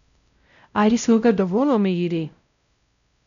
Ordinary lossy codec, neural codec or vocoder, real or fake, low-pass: none; codec, 16 kHz, 0.5 kbps, X-Codec, WavLM features, trained on Multilingual LibriSpeech; fake; 7.2 kHz